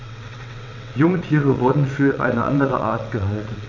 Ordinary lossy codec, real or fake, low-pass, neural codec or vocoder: MP3, 64 kbps; fake; 7.2 kHz; vocoder, 22.05 kHz, 80 mel bands, WaveNeXt